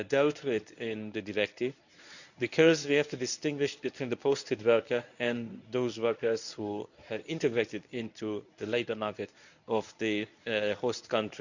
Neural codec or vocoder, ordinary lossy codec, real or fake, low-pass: codec, 24 kHz, 0.9 kbps, WavTokenizer, medium speech release version 1; none; fake; 7.2 kHz